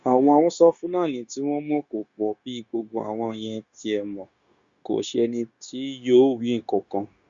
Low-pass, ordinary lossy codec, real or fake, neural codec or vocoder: 7.2 kHz; Opus, 64 kbps; fake; codec, 16 kHz, 0.9 kbps, LongCat-Audio-Codec